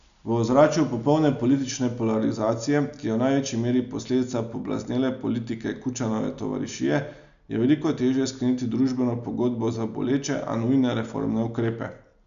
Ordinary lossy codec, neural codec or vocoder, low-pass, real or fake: none; none; 7.2 kHz; real